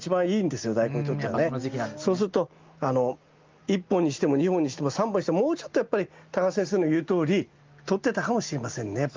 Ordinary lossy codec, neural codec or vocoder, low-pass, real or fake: Opus, 24 kbps; none; 7.2 kHz; real